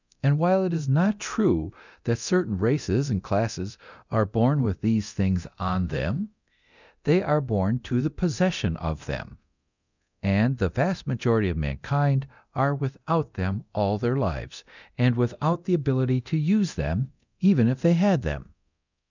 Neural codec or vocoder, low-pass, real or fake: codec, 24 kHz, 0.9 kbps, DualCodec; 7.2 kHz; fake